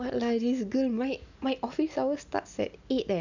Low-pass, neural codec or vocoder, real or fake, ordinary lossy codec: 7.2 kHz; none; real; none